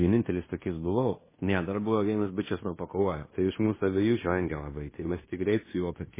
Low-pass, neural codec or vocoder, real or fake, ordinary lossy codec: 3.6 kHz; codec, 16 kHz in and 24 kHz out, 0.9 kbps, LongCat-Audio-Codec, fine tuned four codebook decoder; fake; MP3, 16 kbps